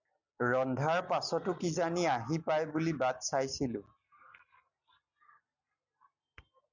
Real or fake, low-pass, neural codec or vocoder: real; 7.2 kHz; none